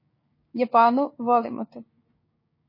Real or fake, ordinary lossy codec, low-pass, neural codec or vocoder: fake; MP3, 24 kbps; 5.4 kHz; codec, 16 kHz, 6 kbps, DAC